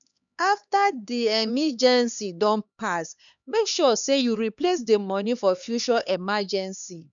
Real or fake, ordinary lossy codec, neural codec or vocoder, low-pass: fake; none; codec, 16 kHz, 2 kbps, X-Codec, HuBERT features, trained on LibriSpeech; 7.2 kHz